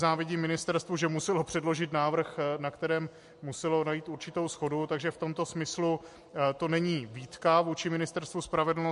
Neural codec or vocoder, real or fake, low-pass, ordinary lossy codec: none; real; 14.4 kHz; MP3, 48 kbps